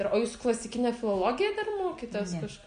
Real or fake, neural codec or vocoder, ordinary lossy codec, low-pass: real; none; MP3, 48 kbps; 9.9 kHz